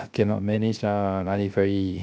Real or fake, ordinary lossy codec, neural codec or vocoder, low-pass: fake; none; codec, 16 kHz, 0.3 kbps, FocalCodec; none